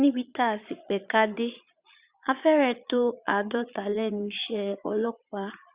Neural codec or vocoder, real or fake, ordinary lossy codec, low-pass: vocoder, 22.05 kHz, 80 mel bands, Vocos; fake; Opus, 64 kbps; 3.6 kHz